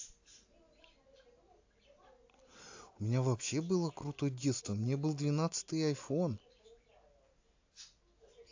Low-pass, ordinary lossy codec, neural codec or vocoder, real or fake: 7.2 kHz; AAC, 48 kbps; none; real